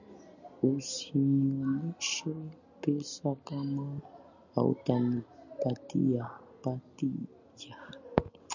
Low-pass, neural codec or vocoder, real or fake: 7.2 kHz; none; real